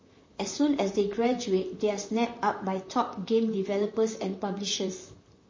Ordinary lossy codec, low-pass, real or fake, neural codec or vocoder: MP3, 32 kbps; 7.2 kHz; fake; vocoder, 44.1 kHz, 128 mel bands, Pupu-Vocoder